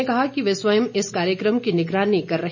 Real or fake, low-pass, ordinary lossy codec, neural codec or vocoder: real; 7.2 kHz; none; none